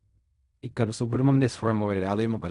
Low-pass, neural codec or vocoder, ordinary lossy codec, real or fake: 10.8 kHz; codec, 16 kHz in and 24 kHz out, 0.4 kbps, LongCat-Audio-Codec, fine tuned four codebook decoder; none; fake